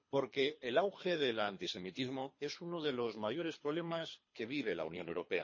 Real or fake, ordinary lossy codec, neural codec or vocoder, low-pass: fake; MP3, 32 kbps; codec, 24 kHz, 3 kbps, HILCodec; 7.2 kHz